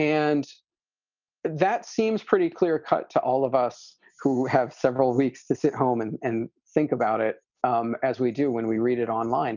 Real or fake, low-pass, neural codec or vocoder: real; 7.2 kHz; none